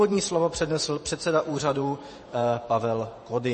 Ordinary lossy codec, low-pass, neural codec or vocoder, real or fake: MP3, 32 kbps; 10.8 kHz; vocoder, 48 kHz, 128 mel bands, Vocos; fake